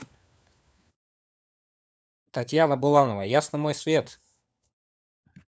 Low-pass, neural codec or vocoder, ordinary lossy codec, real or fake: none; codec, 16 kHz, 4 kbps, FunCodec, trained on LibriTTS, 50 frames a second; none; fake